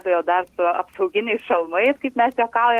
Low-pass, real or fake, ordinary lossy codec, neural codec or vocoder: 14.4 kHz; real; Opus, 16 kbps; none